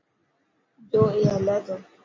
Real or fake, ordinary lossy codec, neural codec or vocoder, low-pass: real; MP3, 32 kbps; none; 7.2 kHz